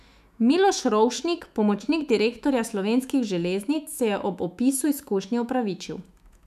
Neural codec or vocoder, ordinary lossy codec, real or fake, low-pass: autoencoder, 48 kHz, 128 numbers a frame, DAC-VAE, trained on Japanese speech; none; fake; 14.4 kHz